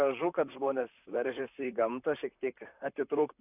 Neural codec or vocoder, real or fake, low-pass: vocoder, 44.1 kHz, 128 mel bands, Pupu-Vocoder; fake; 3.6 kHz